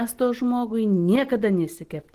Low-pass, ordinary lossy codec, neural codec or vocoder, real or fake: 14.4 kHz; Opus, 16 kbps; none; real